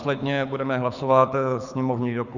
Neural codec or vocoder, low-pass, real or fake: codec, 24 kHz, 6 kbps, HILCodec; 7.2 kHz; fake